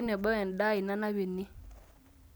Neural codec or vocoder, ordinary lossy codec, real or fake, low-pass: none; none; real; none